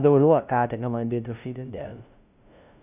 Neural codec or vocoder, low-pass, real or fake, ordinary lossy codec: codec, 16 kHz, 0.5 kbps, FunCodec, trained on LibriTTS, 25 frames a second; 3.6 kHz; fake; none